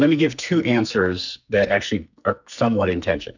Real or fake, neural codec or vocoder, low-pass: fake; codec, 44.1 kHz, 2.6 kbps, SNAC; 7.2 kHz